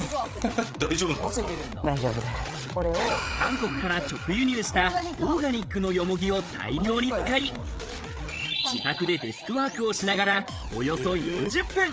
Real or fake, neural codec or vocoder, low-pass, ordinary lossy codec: fake; codec, 16 kHz, 8 kbps, FreqCodec, larger model; none; none